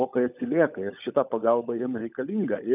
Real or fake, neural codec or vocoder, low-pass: fake; codec, 16 kHz, 16 kbps, FunCodec, trained on LibriTTS, 50 frames a second; 3.6 kHz